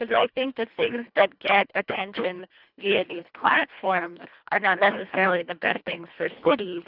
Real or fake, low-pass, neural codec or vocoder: fake; 5.4 kHz; codec, 24 kHz, 1.5 kbps, HILCodec